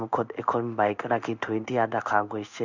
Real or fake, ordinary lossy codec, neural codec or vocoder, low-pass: fake; none; codec, 16 kHz in and 24 kHz out, 1 kbps, XY-Tokenizer; 7.2 kHz